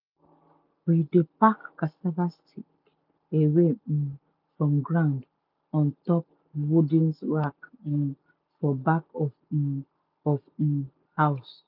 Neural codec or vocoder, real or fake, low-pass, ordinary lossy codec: none; real; 5.4 kHz; none